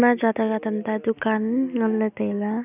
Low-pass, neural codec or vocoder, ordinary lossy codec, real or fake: 3.6 kHz; none; none; real